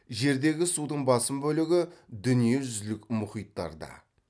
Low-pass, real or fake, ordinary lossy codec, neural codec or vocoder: none; real; none; none